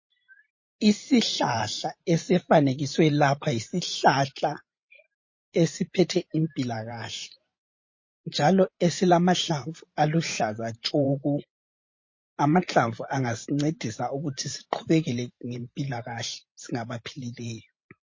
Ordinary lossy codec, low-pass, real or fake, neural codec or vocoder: MP3, 32 kbps; 7.2 kHz; fake; vocoder, 44.1 kHz, 128 mel bands every 512 samples, BigVGAN v2